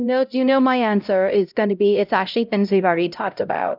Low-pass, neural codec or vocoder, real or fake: 5.4 kHz; codec, 16 kHz, 0.5 kbps, X-Codec, HuBERT features, trained on LibriSpeech; fake